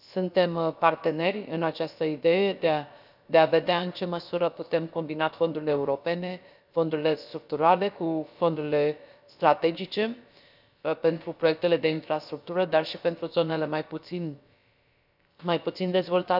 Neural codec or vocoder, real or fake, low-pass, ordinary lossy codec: codec, 16 kHz, about 1 kbps, DyCAST, with the encoder's durations; fake; 5.4 kHz; none